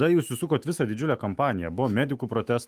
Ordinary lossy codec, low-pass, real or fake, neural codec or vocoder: Opus, 32 kbps; 14.4 kHz; fake; autoencoder, 48 kHz, 128 numbers a frame, DAC-VAE, trained on Japanese speech